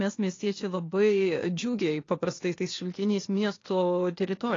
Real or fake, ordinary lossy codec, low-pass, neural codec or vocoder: fake; AAC, 32 kbps; 7.2 kHz; codec, 16 kHz, 0.8 kbps, ZipCodec